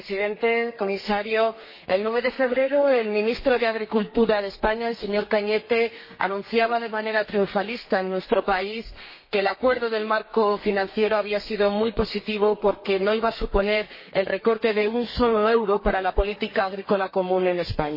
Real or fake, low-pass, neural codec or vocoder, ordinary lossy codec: fake; 5.4 kHz; codec, 32 kHz, 1.9 kbps, SNAC; MP3, 24 kbps